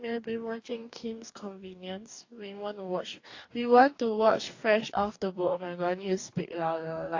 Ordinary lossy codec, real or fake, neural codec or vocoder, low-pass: AAC, 48 kbps; fake; codec, 44.1 kHz, 2.6 kbps, DAC; 7.2 kHz